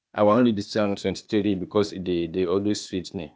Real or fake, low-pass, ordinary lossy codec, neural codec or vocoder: fake; none; none; codec, 16 kHz, 0.8 kbps, ZipCodec